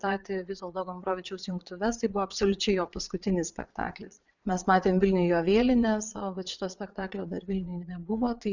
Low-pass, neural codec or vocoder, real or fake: 7.2 kHz; vocoder, 22.05 kHz, 80 mel bands, Vocos; fake